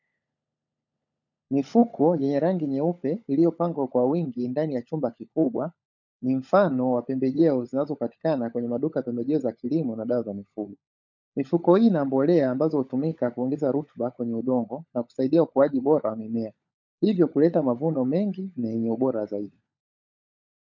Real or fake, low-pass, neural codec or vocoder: fake; 7.2 kHz; codec, 16 kHz, 16 kbps, FunCodec, trained on LibriTTS, 50 frames a second